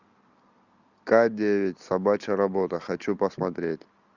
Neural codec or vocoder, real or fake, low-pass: none; real; 7.2 kHz